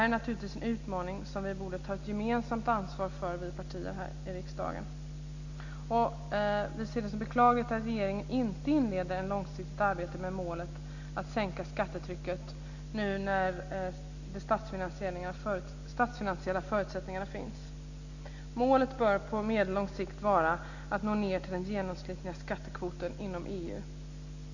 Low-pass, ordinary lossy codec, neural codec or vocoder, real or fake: 7.2 kHz; none; none; real